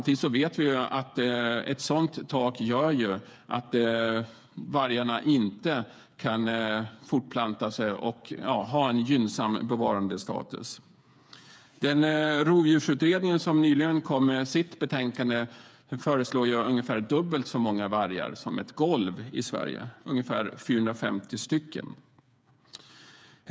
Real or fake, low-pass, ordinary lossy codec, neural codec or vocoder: fake; none; none; codec, 16 kHz, 8 kbps, FreqCodec, smaller model